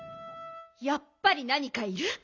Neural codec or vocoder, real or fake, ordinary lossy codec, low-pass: none; real; none; 7.2 kHz